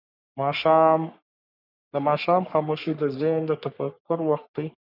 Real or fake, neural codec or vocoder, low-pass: fake; codec, 44.1 kHz, 3.4 kbps, Pupu-Codec; 5.4 kHz